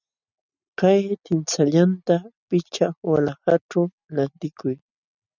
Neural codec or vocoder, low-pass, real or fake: none; 7.2 kHz; real